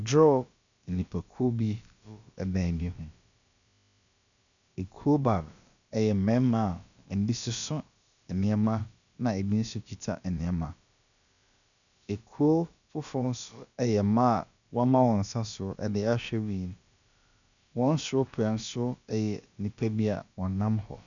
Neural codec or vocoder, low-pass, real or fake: codec, 16 kHz, about 1 kbps, DyCAST, with the encoder's durations; 7.2 kHz; fake